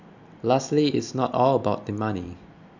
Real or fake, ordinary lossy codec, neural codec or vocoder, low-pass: real; none; none; 7.2 kHz